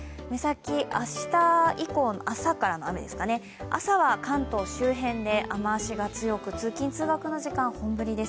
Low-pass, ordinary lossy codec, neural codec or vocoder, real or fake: none; none; none; real